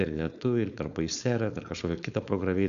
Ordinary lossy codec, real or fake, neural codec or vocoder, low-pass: MP3, 96 kbps; fake; codec, 16 kHz, 4.8 kbps, FACodec; 7.2 kHz